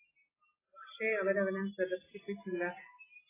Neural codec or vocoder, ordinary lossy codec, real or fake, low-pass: none; AAC, 16 kbps; real; 3.6 kHz